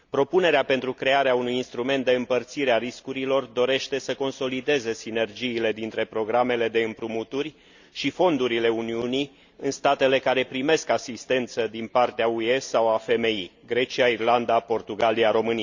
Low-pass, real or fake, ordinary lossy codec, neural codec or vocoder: 7.2 kHz; real; Opus, 64 kbps; none